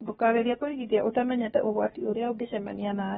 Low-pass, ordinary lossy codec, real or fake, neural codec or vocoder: 7.2 kHz; AAC, 16 kbps; fake; codec, 16 kHz, about 1 kbps, DyCAST, with the encoder's durations